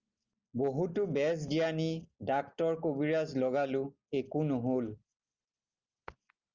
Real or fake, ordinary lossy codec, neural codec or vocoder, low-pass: real; Opus, 32 kbps; none; 7.2 kHz